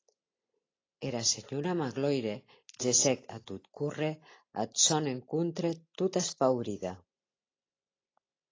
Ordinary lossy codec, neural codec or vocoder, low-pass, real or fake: AAC, 32 kbps; none; 7.2 kHz; real